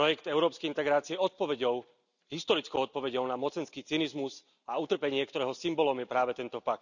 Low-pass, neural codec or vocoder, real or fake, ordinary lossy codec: 7.2 kHz; none; real; none